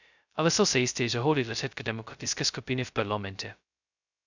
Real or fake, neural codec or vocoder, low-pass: fake; codec, 16 kHz, 0.2 kbps, FocalCodec; 7.2 kHz